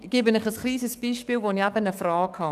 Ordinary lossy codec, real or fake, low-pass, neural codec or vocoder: none; fake; 14.4 kHz; codec, 44.1 kHz, 7.8 kbps, DAC